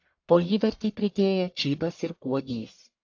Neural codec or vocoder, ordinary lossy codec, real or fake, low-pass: codec, 44.1 kHz, 1.7 kbps, Pupu-Codec; AAC, 48 kbps; fake; 7.2 kHz